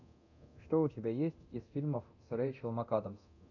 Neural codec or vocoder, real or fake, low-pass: codec, 24 kHz, 0.9 kbps, DualCodec; fake; 7.2 kHz